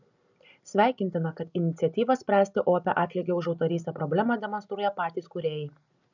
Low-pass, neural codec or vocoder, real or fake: 7.2 kHz; none; real